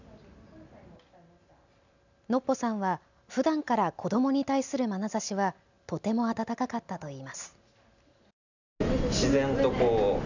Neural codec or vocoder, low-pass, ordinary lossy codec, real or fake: none; 7.2 kHz; none; real